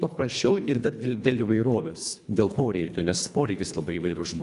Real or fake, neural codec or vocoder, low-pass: fake; codec, 24 kHz, 1.5 kbps, HILCodec; 10.8 kHz